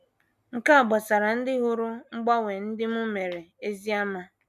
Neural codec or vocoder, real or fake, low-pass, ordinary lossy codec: none; real; 14.4 kHz; none